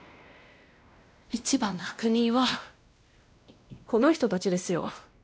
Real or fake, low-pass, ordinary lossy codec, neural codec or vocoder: fake; none; none; codec, 16 kHz, 1 kbps, X-Codec, WavLM features, trained on Multilingual LibriSpeech